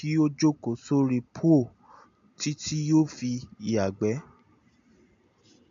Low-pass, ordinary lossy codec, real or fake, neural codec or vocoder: 7.2 kHz; AAC, 64 kbps; real; none